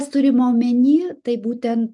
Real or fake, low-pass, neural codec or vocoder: real; 10.8 kHz; none